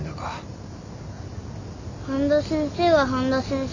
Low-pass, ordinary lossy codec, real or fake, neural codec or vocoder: 7.2 kHz; none; real; none